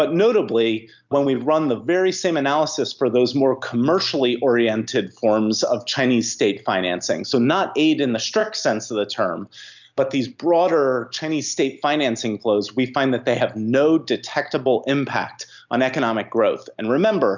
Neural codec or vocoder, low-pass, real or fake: none; 7.2 kHz; real